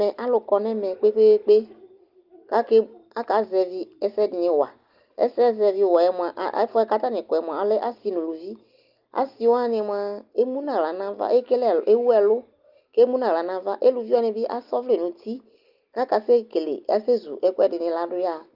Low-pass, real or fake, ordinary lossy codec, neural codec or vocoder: 5.4 kHz; real; Opus, 32 kbps; none